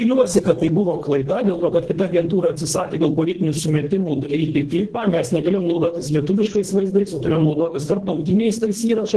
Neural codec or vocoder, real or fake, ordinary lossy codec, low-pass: codec, 24 kHz, 1.5 kbps, HILCodec; fake; Opus, 16 kbps; 10.8 kHz